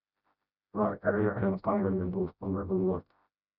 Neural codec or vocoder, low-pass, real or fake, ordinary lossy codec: codec, 16 kHz, 0.5 kbps, FreqCodec, smaller model; 5.4 kHz; fake; none